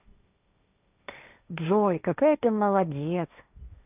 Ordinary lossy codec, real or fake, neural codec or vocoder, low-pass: none; fake; codec, 16 kHz, 1.1 kbps, Voila-Tokenizer; 3.6 kHz